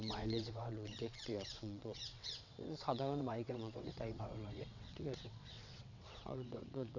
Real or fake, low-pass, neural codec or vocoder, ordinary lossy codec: fake; 7.2 kHz; vocoder, 22.05 kHz, 80 mel bands, WaveNeXt; none